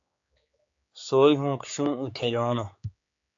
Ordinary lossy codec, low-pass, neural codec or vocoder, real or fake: AAC, 48 kbps; 7.2 kHz; codec, 16 kHz, 4 kbps, X-Codec, HuBERT features, trained on general audio; fake